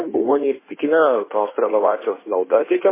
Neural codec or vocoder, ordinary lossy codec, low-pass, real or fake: codec, 16 kHz in and 24 kHz out, 1.1 kbps, FireRedTTS-2 codec; MP3, 16 kbps; 3.6 kHz; fake